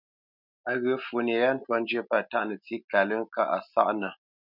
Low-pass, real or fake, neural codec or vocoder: 5.4 kHz; real; none